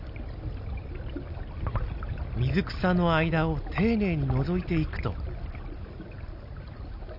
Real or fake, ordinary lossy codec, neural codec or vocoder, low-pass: real; none; none; 5.4 kHz